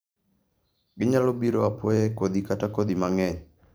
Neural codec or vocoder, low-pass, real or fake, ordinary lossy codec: vocoder, 44.1 kHz, 128 mel bands every 512 samples, BigVGAN v2; none; fake; none